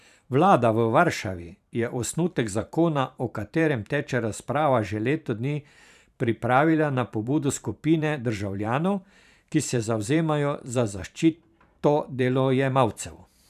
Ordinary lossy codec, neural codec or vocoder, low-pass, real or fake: none; vocoder, 44.1 kHz, 128 mel bands every 512 samples, BigVGAN v2; 14.4 kHz; fake